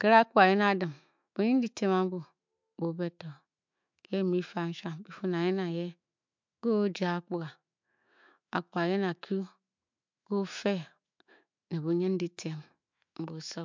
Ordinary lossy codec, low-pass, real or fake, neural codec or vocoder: MP3, 64 kbps; 7.2 kHz; fake; autoencoder, 48 kHz, 128 numbers a frame, DAC-VAE, trained on Japanese speech